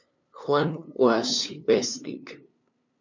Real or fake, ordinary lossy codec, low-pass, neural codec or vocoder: fake; MP3, 64 kbps; 7.2 kHz; codec, 16 kHz, 2 kbps, FunCodec, trained on LibriTTS, 25 frames a second